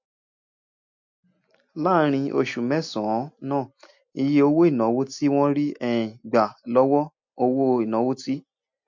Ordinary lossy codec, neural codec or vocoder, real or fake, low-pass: MP3, 48 kbps; none; real; 7.2 kHz